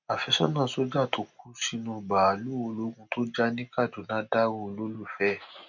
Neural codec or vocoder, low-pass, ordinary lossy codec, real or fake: none; 7.2 kHz; none; real